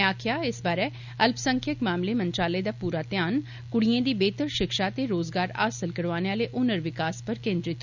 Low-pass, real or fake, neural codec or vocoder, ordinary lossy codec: 7.2 kHz; real; none; none